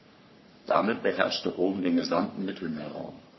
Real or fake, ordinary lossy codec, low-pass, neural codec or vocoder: fake; MP3, 24 kbps; 7.2 kHz; codec, 44.1 kHz, 3.4 kbps, Pupu-Codec